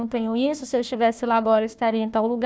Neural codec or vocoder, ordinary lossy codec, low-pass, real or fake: codec, 16 kHz, 1 kbps, FunCodec, trained on Chinese and English, 50 frames a second; none; none; fake